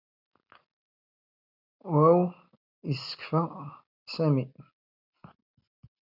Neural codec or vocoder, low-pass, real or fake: none; 5.4 kHz; real